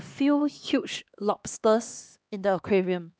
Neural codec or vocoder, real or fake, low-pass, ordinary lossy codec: codec, 16 kHz, 2 kbps, X-Codec, HuBERT features, trained on LibriSpeech; fake; none; none